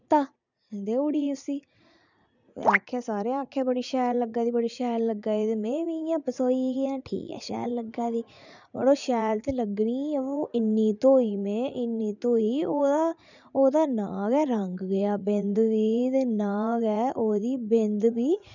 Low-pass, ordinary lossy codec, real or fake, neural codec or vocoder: 7.2 kHz; none; fake; vocoder, 44.1 kHz, 80 mel bands, Vocos